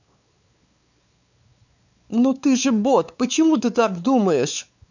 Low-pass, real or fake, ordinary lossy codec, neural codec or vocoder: 7.2 kHz; fake; none; codec, 16 kHz, 4 kbps, X-Codec, WavLM features, trained on Multilingual LibriSpeech